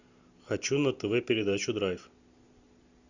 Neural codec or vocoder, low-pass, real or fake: none; 7.2 kHz; real